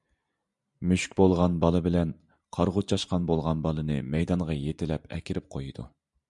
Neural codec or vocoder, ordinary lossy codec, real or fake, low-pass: vocoder, 44.1 kHz, 128 mel bands every 512 samples, BigVGAN v2; MP3, 96 kbps; fake; 10.8 kHz